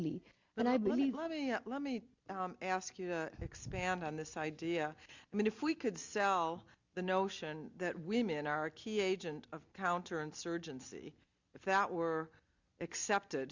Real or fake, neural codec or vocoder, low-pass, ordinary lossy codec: real; none; 7.2 kHz; Opus, 64 kbps